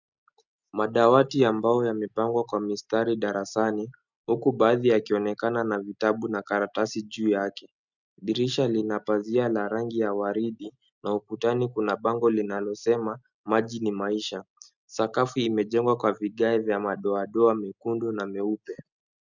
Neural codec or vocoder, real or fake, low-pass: none; real; 7.2 kHz